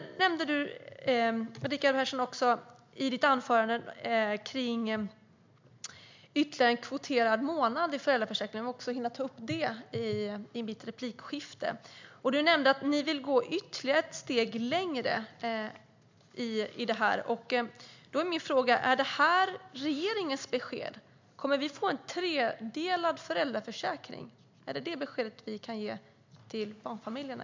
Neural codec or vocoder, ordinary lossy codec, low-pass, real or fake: none; MP3, 64 kbps; 7.2 kHz; real